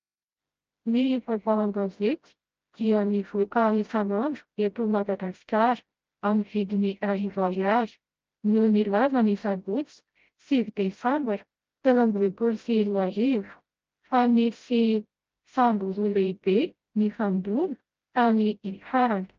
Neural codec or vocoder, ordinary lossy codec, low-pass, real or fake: codec, 16 kHz, 0.5 kbps, FreqCodec, smaller model; Opus, 24 kbps; 7.2 kHz; fake